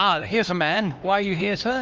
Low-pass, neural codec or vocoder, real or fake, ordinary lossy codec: 7.2 kHz; codec, 16 kHz, 2 kbps, X-Codec, HuBERT features, trained on LibriSpeech; fake; Opus, 24 kbps